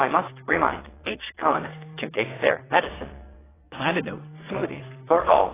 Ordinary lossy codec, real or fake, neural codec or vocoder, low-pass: AAC, 16 kbps; fake; vocoder, 22.05 kHz, 80 mel bands, WaveNeXt; 3.6 kHz